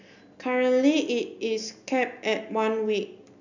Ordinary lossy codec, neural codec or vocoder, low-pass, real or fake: none; none; 7.2 kHz; real